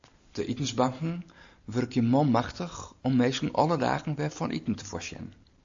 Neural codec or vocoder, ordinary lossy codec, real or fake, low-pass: none; AAC, 64 kbps; real; 7.2 kHz